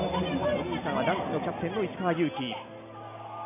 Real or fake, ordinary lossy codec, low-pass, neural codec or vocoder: real; none; 3.6 kHz; none